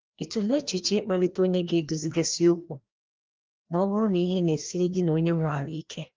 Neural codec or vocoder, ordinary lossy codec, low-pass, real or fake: codec, 16 kHz, 1 kbps, FreqCodec, larger model; Opus, 32 kbps; 7.2 kHz; fake